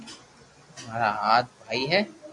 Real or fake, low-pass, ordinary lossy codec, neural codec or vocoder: real; 10.8 kHz; MP3, 96 kbps; none